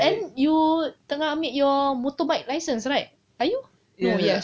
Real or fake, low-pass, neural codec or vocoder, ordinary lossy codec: real; none; none; none